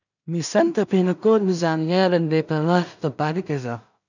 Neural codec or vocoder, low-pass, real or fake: codec, 16 kHz in and 24 kHz out, 0.4 kbps, LongCat-Audio-Codec, two codebook decoder; 7.2 kHz; fake